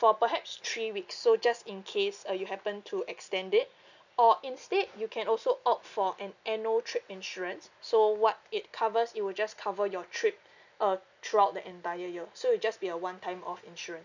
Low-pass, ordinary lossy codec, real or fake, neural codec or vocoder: 7.2 kHz; none; real; none